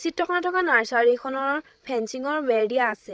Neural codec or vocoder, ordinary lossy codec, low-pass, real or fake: codec, 16 kHz, 16 kbps, FreqCodec, larger model; none; none; fake